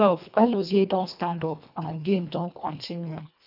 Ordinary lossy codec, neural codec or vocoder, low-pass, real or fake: none; codec, 24 kHz, 1.5 kbps, HILCodec; 5.4 kHz; fake